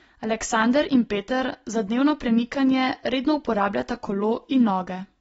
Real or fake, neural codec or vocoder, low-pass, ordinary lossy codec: real; none; 19.8 kHz; AAC, 24 kbps